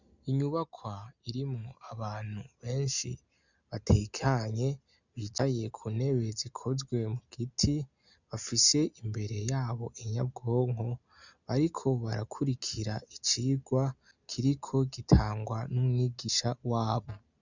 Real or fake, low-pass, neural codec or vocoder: real; 7.2 kHz; none